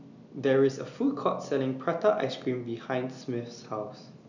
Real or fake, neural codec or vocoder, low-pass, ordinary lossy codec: real; none; 7.2 kHz; none